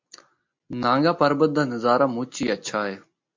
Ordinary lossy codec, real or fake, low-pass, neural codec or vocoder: MP3, 48 kbps; real; 7.2 kHz; none